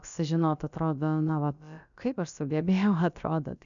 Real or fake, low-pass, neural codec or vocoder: fake; 7.2 kHz; codec, 16 kHz, about 1 kbps, DyCAST, with the encoder's durations